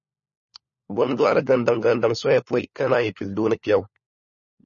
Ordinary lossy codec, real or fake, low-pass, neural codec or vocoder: MP3, 32 kbps; fake; 7.2 kHz; codec, 16 kHz, 4 kbps, FunCodec, trained on LibriTTS, 50 frames a second